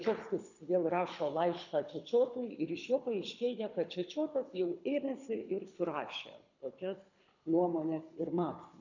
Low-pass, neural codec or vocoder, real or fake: 7.2 kHz; codec, 24 kHz, 6 kbps, HILCodec; fake